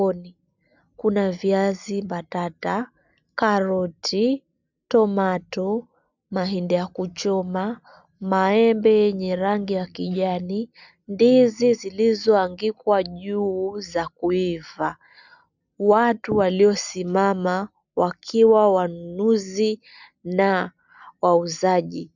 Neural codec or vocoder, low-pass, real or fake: none; 7.2 kHz; real